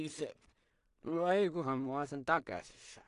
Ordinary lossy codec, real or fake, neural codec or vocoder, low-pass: AAC, 64 kbps; fake; codec, 16 kHz in and 24 kHz out, 0.4 kbps, LongCat-Audio-Codec, two codebook decoder; 10.8 kHz